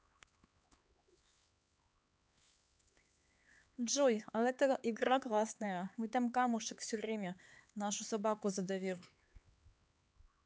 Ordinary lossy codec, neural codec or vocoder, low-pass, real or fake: none; codec, 16 kHz, 4 kbps, X-Codec, HuBERT features, trained on LibriSpeech; none; fake